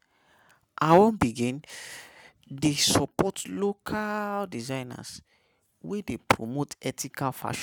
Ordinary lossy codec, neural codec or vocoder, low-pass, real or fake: none; none; none; real